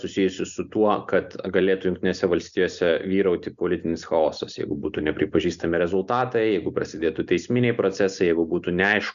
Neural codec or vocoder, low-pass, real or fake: none; 7.2 kHz; real